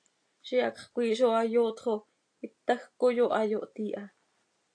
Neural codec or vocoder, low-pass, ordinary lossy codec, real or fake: none; 9.9 kHz; AAC, 48 kbps; real